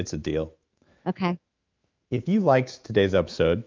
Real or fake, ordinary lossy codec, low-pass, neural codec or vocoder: fake; Opus, 24 kbps; 7.2 kHz; autoencoder, 48 kHz, 128 numbers a frame, DAC-VAE, trained on Japanese speech